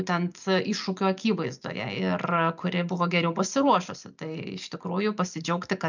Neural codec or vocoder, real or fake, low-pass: none; real; 7.2 kHz